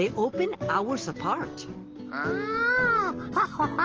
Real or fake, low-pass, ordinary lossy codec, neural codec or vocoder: real; 7.2 kHz; Opus, 16 kbps; none